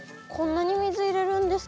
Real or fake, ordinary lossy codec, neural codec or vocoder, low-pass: real; none; none; none